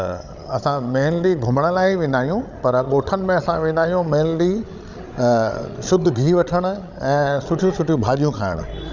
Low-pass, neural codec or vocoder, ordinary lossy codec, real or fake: 7.2 kHz; codec, 16 kHz, 16 kbps, FreqCodec, larger model; none; fake